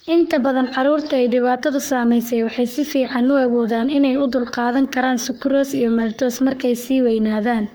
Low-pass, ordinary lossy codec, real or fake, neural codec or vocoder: none; none; fake; codec, 44.1 kHz, 3.4 kbps, Pupu-Codec